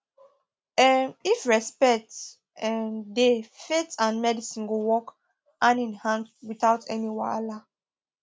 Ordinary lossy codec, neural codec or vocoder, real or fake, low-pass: none; none; real; none